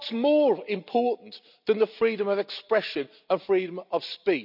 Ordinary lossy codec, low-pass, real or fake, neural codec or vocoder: none; 5.4 kHz; real; none